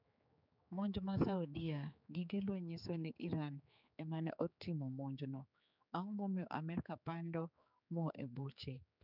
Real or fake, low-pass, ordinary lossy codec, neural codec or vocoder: fake; 5.4 kHz; none; codec, 16 kHz, 4 kbps, X-Codec, HuBERT features, trained on general audio